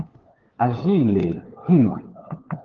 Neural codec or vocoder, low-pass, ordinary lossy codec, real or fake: codec, 16 kHz, 4 kbps, FunCodec, trained on LibriTTS, 50 frames a second; 7.2 kHz; Opus, 16 kbps; fake